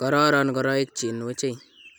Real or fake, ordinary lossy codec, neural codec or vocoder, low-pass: real; none; none; none